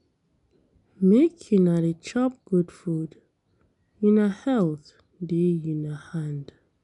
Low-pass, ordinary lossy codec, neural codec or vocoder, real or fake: 10.8 kHz; none; none; real